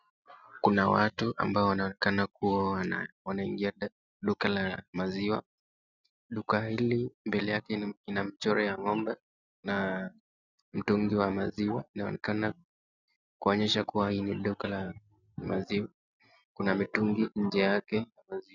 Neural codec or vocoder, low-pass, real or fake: none; 7.2 kHz; real